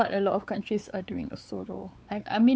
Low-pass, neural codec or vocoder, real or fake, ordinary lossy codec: none; codec, 16 kHz, 4 kbps, X-Codec, WavLM features, trained on Multilingual LibriSpeech; fake; none